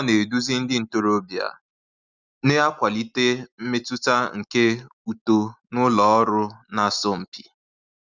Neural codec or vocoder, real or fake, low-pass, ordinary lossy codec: none; real; 7.2 kHz; Opus, 64 kbps